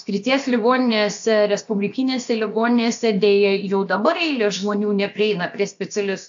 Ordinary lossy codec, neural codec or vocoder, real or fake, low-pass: AAC, 64 kbps; codec, 16 kHz, about 1 kbps, DyCAST, with the encoder's durations; fake; 7.2 kHz